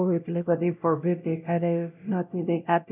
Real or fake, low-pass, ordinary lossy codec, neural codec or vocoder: fake; 3.6 kHz; none; codec, 16 kHz, 0.5 kbps, X-Codec, WavLM features, trained on Multilingual LibriSpeech